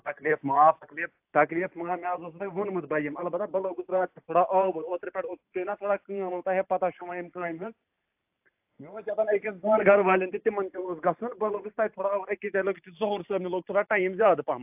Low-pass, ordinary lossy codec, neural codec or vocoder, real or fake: 3.6 kHz; AAC, 32 kbps; vocoder, 44.1 kHz, 128 mel bands every 512 samples, BigVGAN v2; fake